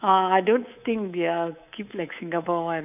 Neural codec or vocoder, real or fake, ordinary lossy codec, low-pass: codec, 24 kHz, 3.1 kbps, DualCodec; fake; none; 3.6 kHz